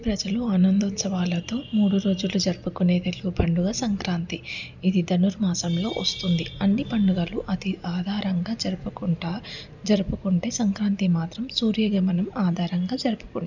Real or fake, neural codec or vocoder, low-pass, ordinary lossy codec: real; none; 7.2 kHz; none